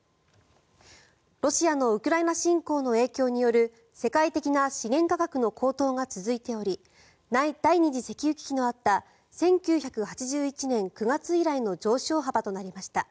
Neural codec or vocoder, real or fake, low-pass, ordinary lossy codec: none; real; none; none